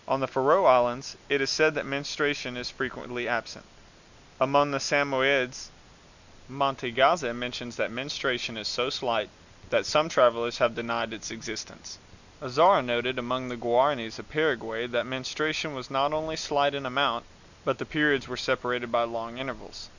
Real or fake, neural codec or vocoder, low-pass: real; none; 7.2 kHz